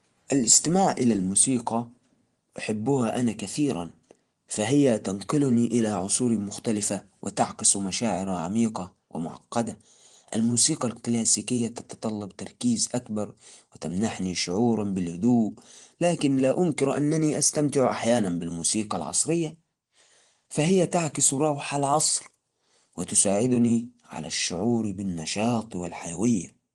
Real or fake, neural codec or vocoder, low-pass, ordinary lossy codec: fake; vocoder, 24 kHz, 100 mel bands, Vocos; 10.8 kHz; Opus, 24 kbps